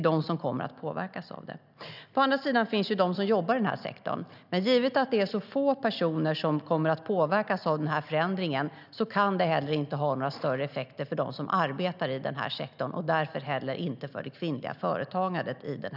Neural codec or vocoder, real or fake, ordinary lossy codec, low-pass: none; real; none; 5.4 kHz